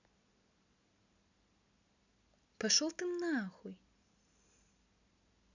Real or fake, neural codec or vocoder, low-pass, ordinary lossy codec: real; none; 7.2 kHz; none